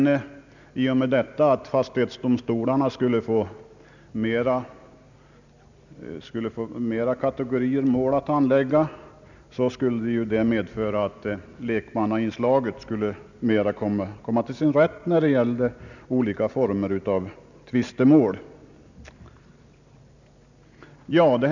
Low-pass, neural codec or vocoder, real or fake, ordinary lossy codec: 7.2 kHz; none; real; none